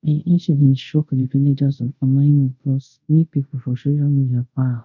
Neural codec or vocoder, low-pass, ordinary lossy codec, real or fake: codec, 24 kHz, 0.5 kbps, DualCodec; 7.2 kHz; none; fake